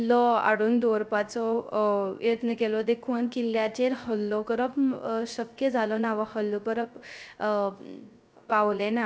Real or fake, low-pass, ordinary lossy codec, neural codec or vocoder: fake; none; none; codec, 16 kHz, 0.3 kbps, FocalCodec